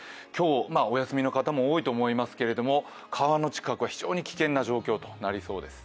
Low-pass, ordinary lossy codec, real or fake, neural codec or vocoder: none; none; real; none